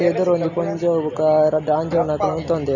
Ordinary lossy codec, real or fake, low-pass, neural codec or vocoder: none; real; 7.2 kHz; none